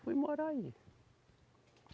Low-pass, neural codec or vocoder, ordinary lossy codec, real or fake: none; none; none; real